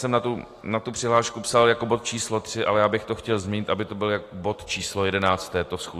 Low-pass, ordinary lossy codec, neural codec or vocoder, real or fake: 14.4 kHz; AAC, 48 kbps; none; real